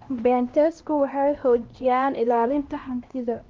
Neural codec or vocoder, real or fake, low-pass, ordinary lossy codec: codec, 16 kHz, 1 kbps, X-Codec, HuBERT features, trained on LibriSpeech; fake; 7.2 kHz; Opus, 24 kbps